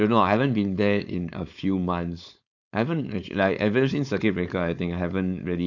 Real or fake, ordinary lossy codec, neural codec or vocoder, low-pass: fake; none; codec, 16 kHz, 4.8 kbps, FACodec; 7.2 kHz